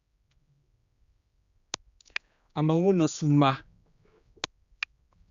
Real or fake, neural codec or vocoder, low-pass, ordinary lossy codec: fake; codec, 16 kHz, 2 kbps, X-Codec, HuBERT features, trained on general audio; 7.2 kHz; Opus, 64 kbps